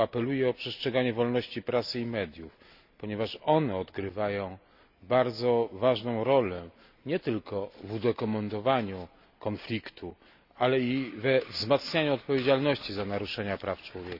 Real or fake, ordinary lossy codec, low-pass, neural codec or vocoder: real; none; 5.4 kHz; none